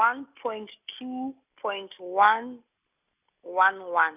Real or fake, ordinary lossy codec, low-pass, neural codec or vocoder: real; none; 3.6 kHz; none